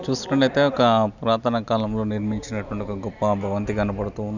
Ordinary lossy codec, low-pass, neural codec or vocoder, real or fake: none; 7.2 kHz; none; real